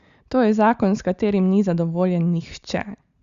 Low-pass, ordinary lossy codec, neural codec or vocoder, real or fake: 7.2 kHz; Opus, 64 kbps; none; real